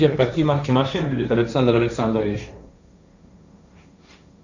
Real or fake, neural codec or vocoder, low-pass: fake; codec, 16 kHz, 1.1 kbps, Voila-Tokenizer; 7.2 kHz